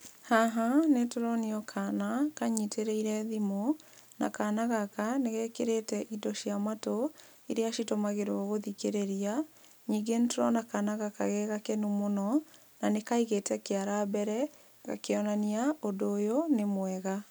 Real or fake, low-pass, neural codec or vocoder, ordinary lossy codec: real; none; none; none